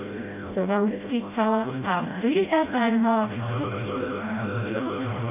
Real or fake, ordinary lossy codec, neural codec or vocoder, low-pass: fake; none; codec, 16 kHz, 0.5 kbps, FreqCodec, smaller model; 3.6 kHz